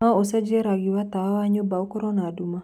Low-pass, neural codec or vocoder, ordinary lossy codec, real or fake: 19.8 kHz; none; none; real